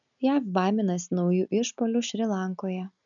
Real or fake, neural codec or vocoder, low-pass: real; none; 7.2 kHz